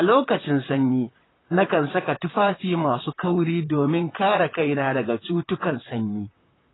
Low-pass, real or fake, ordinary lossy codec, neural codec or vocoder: 7.2 kHz; fake; AAC, 16 kbps; vocoder, 44.1 kHz, 128 mel bands, Pupu-Vocoder